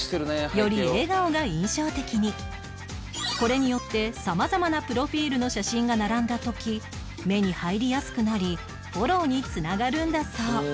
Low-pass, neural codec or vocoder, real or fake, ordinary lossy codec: none; none; real; none